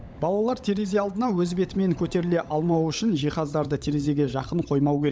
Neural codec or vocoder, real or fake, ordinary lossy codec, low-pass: codec, 16 kHz, 16 kbps, FunCodec, trained on LibriTTS, 50 frames a second; fake; none; none